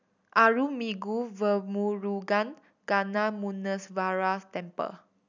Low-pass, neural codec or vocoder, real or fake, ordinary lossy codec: 7.2 kHz; none; real; none